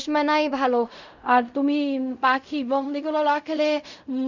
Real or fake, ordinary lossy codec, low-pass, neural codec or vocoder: fake; none; 7.2 kHz; codec, 16 kHz in and 24 kHz out, 0.4 kbps, LongCat-Audio-Codec, fine tuned four codebook decoder